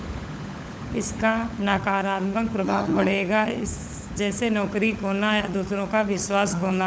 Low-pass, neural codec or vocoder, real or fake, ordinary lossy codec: none; codec, 16 kHz, 16 kbps, FunCodec, trained on LibriTTS, 50 frames a second; fake; none